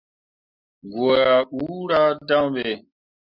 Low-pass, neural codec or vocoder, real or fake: 5.4 kHz; none; real